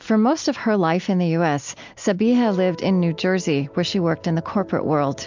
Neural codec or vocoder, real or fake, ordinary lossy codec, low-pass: none; real; MP3, 64 kbps; 7.2 kHz